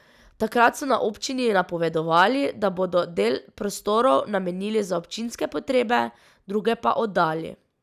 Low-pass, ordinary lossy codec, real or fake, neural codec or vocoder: 14.4 kHz; none; real; none